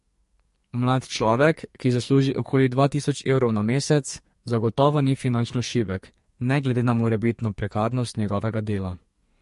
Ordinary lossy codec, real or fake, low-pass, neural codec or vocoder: MP3, 48 kbps; fake; 14.4 kHz; codec, 44.1 kHz, 2.6 kbps, SNAC